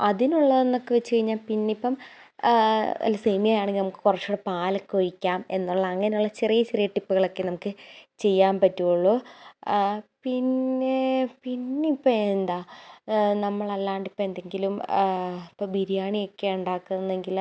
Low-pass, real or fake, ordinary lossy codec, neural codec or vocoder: none; real; none; none